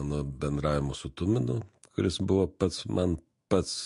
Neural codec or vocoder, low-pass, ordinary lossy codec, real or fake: autoencoder, 48 kHz, 128 numbers a frame, DAC-VAE, trained on Japanese speech; 14.4 kHz; MP3, 48 kbps; fake